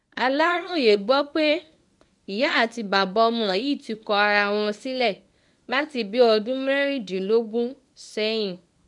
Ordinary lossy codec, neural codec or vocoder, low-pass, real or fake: none; codec, 24 kHz, 0.9 kbps, WavTokenizer, medium speech release version 1; 10.8 kHz; fake